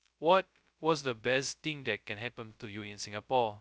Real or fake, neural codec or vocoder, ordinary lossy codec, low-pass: fake; codec, 16 kHz, 0.2 kbps, FocalCodec; none; none